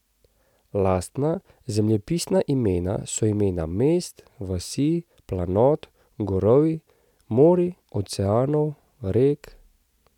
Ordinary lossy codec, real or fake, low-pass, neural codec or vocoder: none; real; 19.8 kHz; none